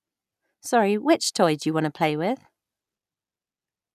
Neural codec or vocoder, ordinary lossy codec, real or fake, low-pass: none; none; real; 14.4 kHz